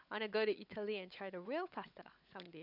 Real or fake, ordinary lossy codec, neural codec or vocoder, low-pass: fake; none; codec, 16 kHz, 16 kbps, FunCodec, trained on LibriTTS, 50 frames a second; 5.4 kHz